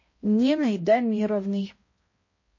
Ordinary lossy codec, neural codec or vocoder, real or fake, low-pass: MP3, 32 kbps; codec, 16 kHz, 0.5 kbps, X-Codec, HuBERT features, trained on balanced general audio; fake; 7.2 kHz